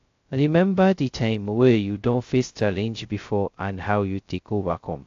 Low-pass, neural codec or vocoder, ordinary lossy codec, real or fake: 7.2 kHz; codec, 16 kHz, 0.2 kbps, FocalCodec; AAC, 48 kbps; fake